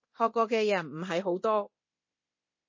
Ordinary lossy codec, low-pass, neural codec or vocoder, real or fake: MP3, 32 kbps; 7.2 kHz; codec, 24 kHz, 0.9 kbps, DualCodec; fake